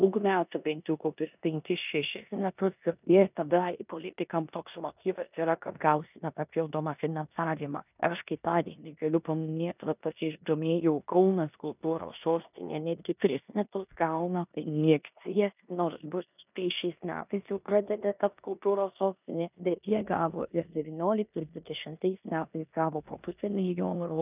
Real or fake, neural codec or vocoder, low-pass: fake; codec, 16 kHz in and 24 kHz out, 0.9 kbps, LongCat-Audio-Codec, four codebook decoder; 3.6 kHz